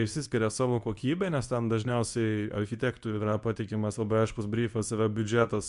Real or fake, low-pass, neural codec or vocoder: fake; 10.8 kHz; codec, 24 kHz, 0.9 kbps, WavTokenizer, medium speech release version 2